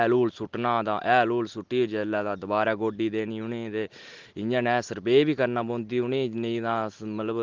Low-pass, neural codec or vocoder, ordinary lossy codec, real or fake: 7.2 kHz; none; Opus, 16 kbps; real